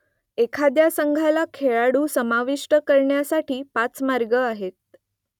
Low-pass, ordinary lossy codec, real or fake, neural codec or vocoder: 19.8 kHz; none; real; none